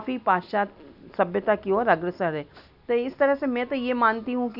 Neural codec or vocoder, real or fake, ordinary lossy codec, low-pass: none; real; none; 5.4 kHz